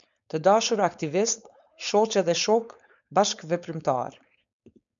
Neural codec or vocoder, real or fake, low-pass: codec, 16 kHz, 4.8 kbps, FACodec; fake; 7.2 kHz